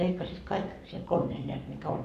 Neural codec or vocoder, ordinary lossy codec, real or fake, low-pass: codec, 44.1 kHz, 7.8 kbps, Pupu-Codec; none; fake; 14.4 kHz